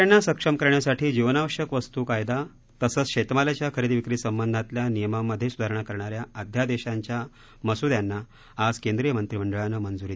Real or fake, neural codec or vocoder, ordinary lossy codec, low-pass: real; none; none; none